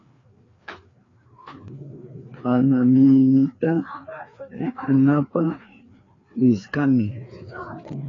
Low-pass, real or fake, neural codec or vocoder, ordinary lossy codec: 7.2 kHz; fake; codec, 16 kHz, 2 kbps, FreqCodec, larger model; AAC, 32 kbps